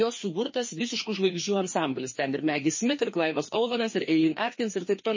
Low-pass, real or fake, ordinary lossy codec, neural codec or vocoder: 7.2 kHz; fake; MP3, 32 kbps; codec, 16 kHz, 2 kbps, FreqCodec, larger model